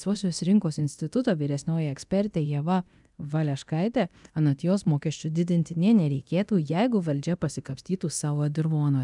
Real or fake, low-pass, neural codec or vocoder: fake; 10.8 kHz; codec, 24 kHz, 0.9 kbps, DualCodec